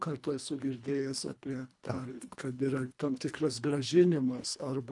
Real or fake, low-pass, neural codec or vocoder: fake; 10.8 kHz; codec, 24 kHz, 1.5 kbps, HILCodec